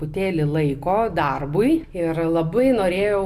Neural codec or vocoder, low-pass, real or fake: none; 14.4 kHz; real